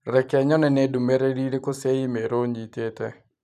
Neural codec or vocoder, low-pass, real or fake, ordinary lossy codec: vocoder, 44.1 kHz, 128 mel bands every 512 samples, BigVGAN v2; 14.4 kHz; fake; none